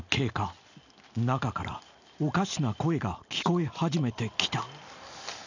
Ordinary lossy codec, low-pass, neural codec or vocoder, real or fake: none; 7.2 kHz; none; real